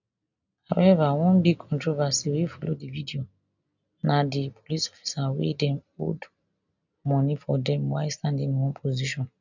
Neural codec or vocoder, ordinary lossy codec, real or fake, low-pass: none; none; real; 7.2 kHz